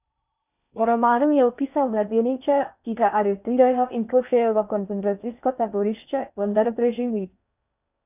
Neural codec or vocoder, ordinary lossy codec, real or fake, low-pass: codec, 16 kHz in and 24 kHz out, 0.6 kbps, FocalCodec, streaming, 4096 codes; none; fake; 3.6 kHz